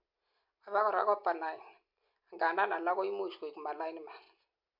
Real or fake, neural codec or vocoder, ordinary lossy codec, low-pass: real; none; MP3, 48 kbps; 5.4 kHz